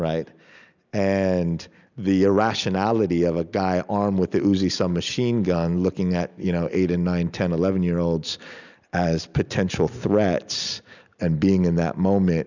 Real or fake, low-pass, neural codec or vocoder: real; 7.2 kHz; none